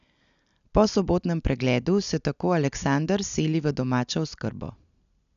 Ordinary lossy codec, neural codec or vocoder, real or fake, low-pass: none; none; real; 7.2 kHz